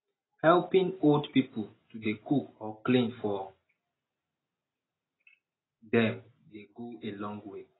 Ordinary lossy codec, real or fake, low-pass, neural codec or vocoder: AAC, 16 kbps; real; 7.2 kHz; none